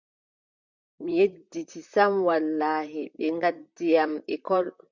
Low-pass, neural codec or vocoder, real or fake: 7.2 kHz; vocoder, 44.1 kHz, 128 mel bands, Pupu-Vocoder; fake